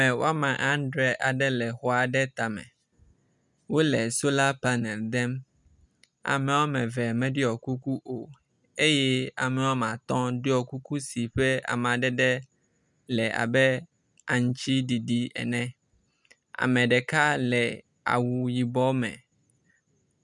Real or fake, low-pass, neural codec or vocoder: real; 10.8 kHz; none